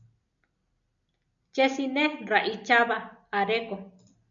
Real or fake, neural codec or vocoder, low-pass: real; none; 7.2 kHz